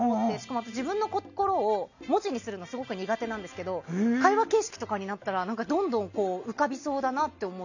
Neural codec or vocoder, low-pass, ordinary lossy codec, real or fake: none; 7.2 kHz; none; real